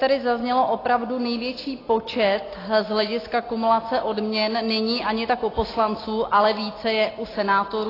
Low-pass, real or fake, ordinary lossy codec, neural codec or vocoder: 5.4 kHz; real; AAC, 24 kbps; none